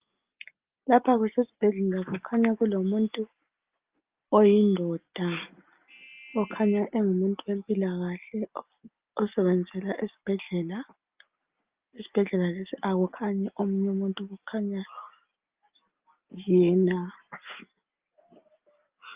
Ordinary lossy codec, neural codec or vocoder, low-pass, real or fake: Opus, 24 kbps; none; 3.6 kHz; real